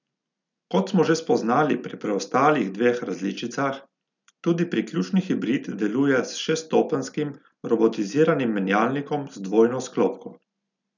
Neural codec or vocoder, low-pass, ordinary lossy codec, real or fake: none; 7.2 kHz; none; real